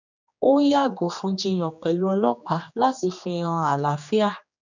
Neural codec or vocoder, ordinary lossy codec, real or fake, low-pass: codec, 16 kHz, 2 kbps, X-Codec, HuBERT features, trained on general audio; none; fake; 7.2 kHz